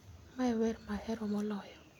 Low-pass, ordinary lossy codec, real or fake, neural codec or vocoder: 19.8 kHz; none; real; none